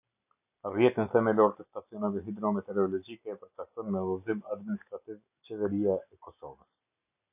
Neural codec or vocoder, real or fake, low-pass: none; real; 3.6 kHz